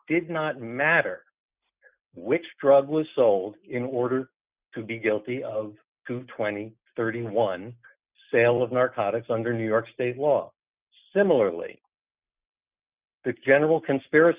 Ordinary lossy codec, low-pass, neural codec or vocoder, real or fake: Opus, 64 kbps; 3.6 kHz; none; real